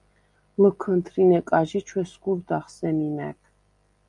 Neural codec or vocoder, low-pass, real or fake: none; 10.8 kHz; real